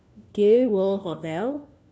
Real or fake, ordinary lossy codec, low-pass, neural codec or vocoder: fake; none; none; codec, 16 kHz, 1 kbps, FunCodec, trained on LibriTTS, 50 frames a second